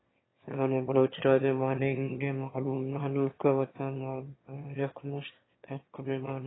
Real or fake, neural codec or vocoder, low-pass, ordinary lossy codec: fake; autoencoder, 22.05 kHz, a latent of 192 numbers a frame, VITS, trained on one speaker; 7.2 kHz; AAC, 16 kbps